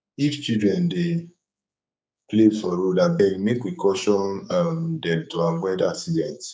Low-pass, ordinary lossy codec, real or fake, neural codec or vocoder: none; none; fake; codec, 16 kHz, 4 kbps, X-Codec, HuBERT features, trained on general audio